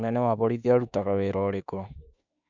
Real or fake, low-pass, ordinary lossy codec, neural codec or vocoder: fake; 7.2 kHz; none; autoencoder, 48 kHz, 32 numbers a frame, DAC-VAE, trained on Japanese speech